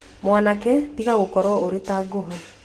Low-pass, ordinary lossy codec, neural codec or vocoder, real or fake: 14.4 kHz; Opus, 16 kbps; codec, 44.1 kHz, 7.8 kbps, DAC; fake